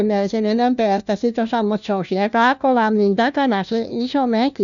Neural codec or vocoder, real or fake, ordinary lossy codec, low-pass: codec, 16 kHz, 1 kbps, FunCodec, trained on LibriTTS, 50 frames a second; fake; none; 7.2 kHz